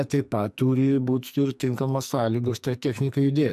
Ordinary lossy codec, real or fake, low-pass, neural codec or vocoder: AAC, 96 kbps; fake; 14.4 kHz; codec, 44.1 kHz, 2.6 kbps, SNAC